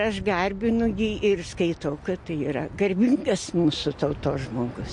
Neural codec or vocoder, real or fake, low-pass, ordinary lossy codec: none; real; 10.8 kHz; MP3, 48 kbps